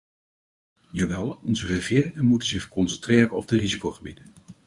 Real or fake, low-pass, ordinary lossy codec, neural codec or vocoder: fake; 10.8 kHz; Opus, 64 kbps; codec, 24 kHz, 0.9 kbps, WavTokenizer, medium speech release version 1